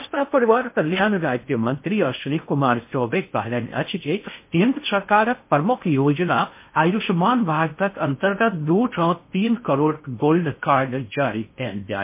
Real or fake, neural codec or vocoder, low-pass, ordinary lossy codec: fake; codec, 16 kHz in and 24 kHz out, 0.6 kbps, FocalCodec, streaming, 2048 codes; 3.6 kHz; MP3, 24 kbps